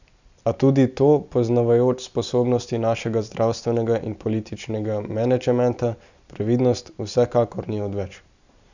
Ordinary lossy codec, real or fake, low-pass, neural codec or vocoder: none; real; 7.2 kHz; none